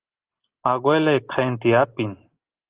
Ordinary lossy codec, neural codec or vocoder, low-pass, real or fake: Opus, 16 kbps; none; 3.6 kHz; real